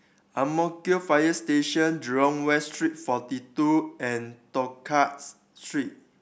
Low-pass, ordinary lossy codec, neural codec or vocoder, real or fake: none; none; none; real